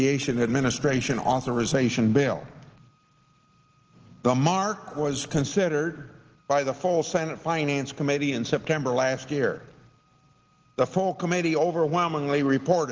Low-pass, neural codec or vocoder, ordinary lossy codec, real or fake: 7.2 kHz; none; Opus, 16 kbps; real